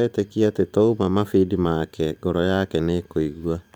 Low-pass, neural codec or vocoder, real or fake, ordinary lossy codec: none; vocoder, 44.1 kHz, 128 mel bands every 512 samples, BigVGAN v2; fake; none